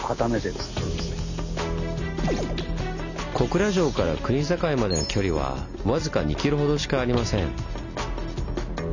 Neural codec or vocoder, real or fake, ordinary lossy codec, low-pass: none; real; none; 7.2 kHz